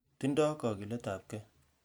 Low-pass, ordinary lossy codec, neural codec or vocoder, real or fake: none; none; none; real